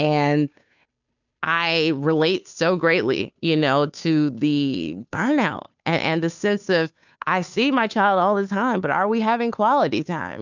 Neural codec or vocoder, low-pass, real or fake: codec, 16 kHz, 2 kbps, FunCodec, trained on Chinese and English, 25 frames a second; 7.2 kHz; fake